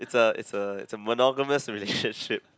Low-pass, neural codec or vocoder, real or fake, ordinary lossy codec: none; none; real; none